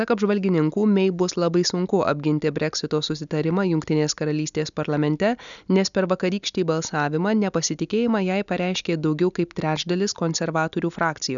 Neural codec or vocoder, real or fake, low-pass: none; real; 7.2 kHz